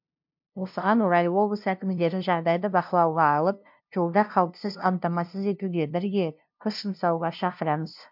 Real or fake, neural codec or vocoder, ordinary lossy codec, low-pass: fake; codec, 16 kHz, 0.5 kbps, FunCodec, trained on LibriTTS, 25 frames a second; MP3, 48 kbps; 5.4 kHz